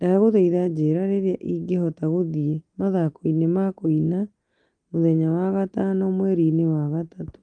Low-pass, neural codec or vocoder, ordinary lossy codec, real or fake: 9.9 kHz; none; Opus, 32 kbps; real